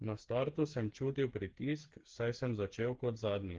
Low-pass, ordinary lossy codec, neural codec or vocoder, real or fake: 7.2 kHz; Opus, 16 kbps; codec, 16 kHz, 4 kbps, FreqCodec, smaller model; fake